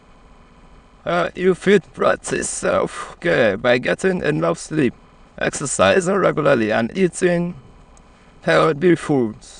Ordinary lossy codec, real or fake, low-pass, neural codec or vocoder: none; fake; 9.9 kHz; autoencoder, 22.05 kHz, a latent of 192 numbers a frame, VITS, trained on many speakers